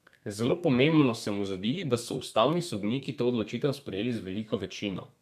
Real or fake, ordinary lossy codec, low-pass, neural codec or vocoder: fake; none; 14.4 kHz; codec, 32 kHz, 1.9 kbps, SNAC